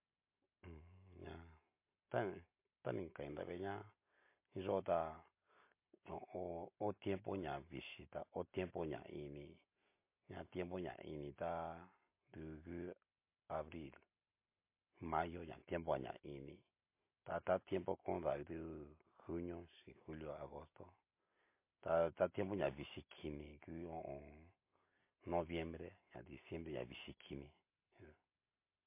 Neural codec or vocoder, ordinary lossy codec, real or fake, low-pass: none; MP3, 24 kbps; real; 3.6 kHz